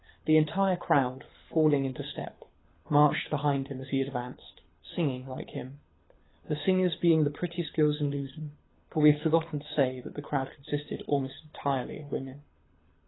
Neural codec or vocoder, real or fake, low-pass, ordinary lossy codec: codec, 24 kHz, 6 kbps, HILCodec; fake; 7.2 kHz; AAC, 16 kbps